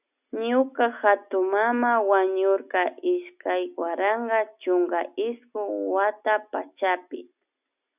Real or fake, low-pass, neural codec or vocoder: real; 3.6 kHz; none